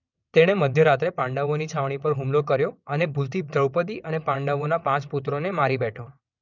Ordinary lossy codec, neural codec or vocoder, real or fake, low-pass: none; vocoder, 22.05 kHz, 80 mel bands, WaveNeXt; fake; 7.2 kHz